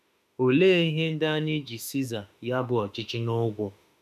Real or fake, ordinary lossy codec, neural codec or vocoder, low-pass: fake; none; autoencoder, 48 kHz, 32 numbers a frame, DAC-VAE, trained on Japanese speech; 14.4 kHz